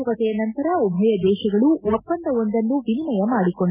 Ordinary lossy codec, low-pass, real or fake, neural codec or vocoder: none; 3.6 kHz; real; none